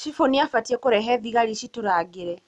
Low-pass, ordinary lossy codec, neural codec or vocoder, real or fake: none; none; none; real